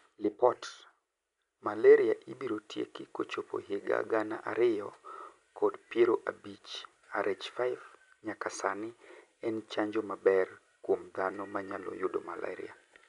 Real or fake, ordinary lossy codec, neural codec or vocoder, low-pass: real; none; none; 10.8 kHz